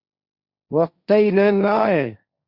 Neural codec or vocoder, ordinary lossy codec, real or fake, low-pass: codec, 16 kHz, 1.1 kbps, Voila-Tokenizer; Opus, 64 kbps; fake; 5.4 kHz